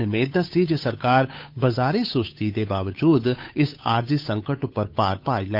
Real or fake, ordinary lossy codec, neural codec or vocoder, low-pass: fake; none; codec, 16 kHz, 8 kbps, FunCodec, trained on Chinese and English, 25 frames a second; 5.4 kHz